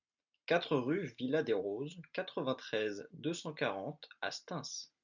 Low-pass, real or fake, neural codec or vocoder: 7.2 kHz; real; none